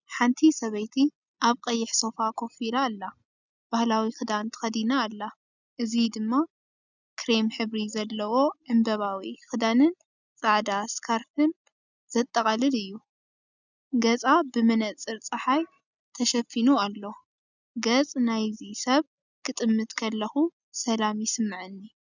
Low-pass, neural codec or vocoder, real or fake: 7.2 kHz; none; real